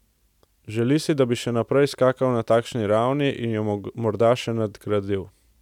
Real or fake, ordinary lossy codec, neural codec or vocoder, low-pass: real; none; none; 19.8 kHz